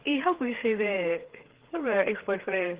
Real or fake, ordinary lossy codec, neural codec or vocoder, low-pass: fake; Opus, 16 kbps; codec, 16 kHz, 4 kbps, FreqCodec, larger model; 3.6 kHz